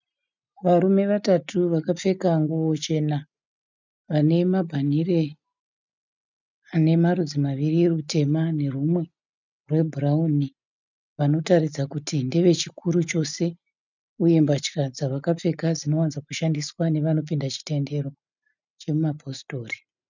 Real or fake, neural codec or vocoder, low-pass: real; none; 7.2 kHz